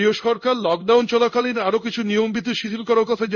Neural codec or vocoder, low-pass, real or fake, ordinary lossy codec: codec, 16 kHz in and 24 kHz out, 1 kbps, XY-Tokenizer; 7.2 kHz; fake; none